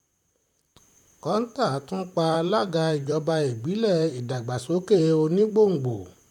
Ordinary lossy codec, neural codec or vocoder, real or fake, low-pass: none; vocoder, 44.1 kHz, 128 mel bands, Pupu-Vocoder; fake; 19.8 kHz